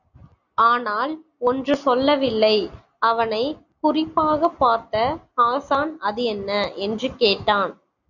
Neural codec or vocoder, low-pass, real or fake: none; 7.2 kHz; real